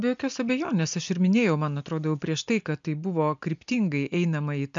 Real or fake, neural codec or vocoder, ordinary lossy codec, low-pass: real; none; MP3, 64 kbps; 7.2 kHz